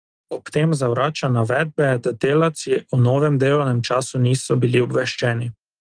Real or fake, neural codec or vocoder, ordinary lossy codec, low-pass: real; none; Opus, 24 kbps; 9.9 kHz